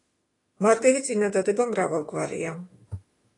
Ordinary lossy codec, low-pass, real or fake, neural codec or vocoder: AAC, 32 kbps; 10.8 kHz; fake; autoencoder, 48 kHz, 32 numbers a frame, DAC-VAE, trained on Japanese speech